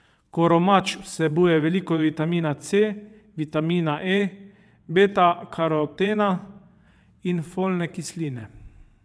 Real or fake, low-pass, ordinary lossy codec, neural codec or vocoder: fake; none; none; vocoder, 22.05 kHz, 80 mel bands, WaveNeXt